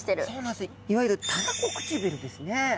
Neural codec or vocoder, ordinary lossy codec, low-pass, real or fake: none; none; none; real